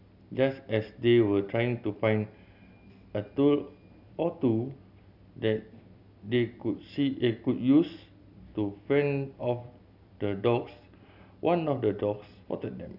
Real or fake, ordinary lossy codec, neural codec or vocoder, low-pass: real; none; none; 5.4 kHz